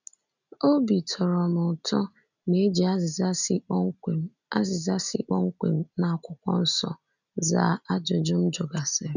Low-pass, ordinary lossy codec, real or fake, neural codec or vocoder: 7.2 kHz; none; real; none